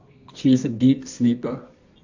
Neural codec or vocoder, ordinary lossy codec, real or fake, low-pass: codec, 24 kHz, 0.9 kbps, WavTokenizer, medium music audio release; AAC, 48 kbps; fake; 7.2 kHz